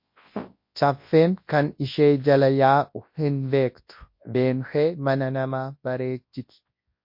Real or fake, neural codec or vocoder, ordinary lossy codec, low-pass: fake; codec, 24 kHz, 0.9 kbps, WavTokenizer, large speech release; MP3, 32 kbps; 5.4 kHz